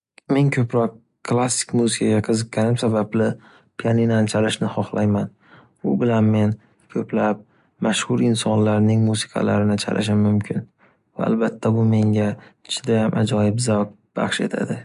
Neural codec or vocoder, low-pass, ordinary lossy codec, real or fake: none; 10.8 kHz; MP3, 64 kbps; real